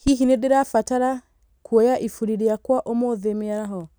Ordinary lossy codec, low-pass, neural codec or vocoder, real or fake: none; none; none; real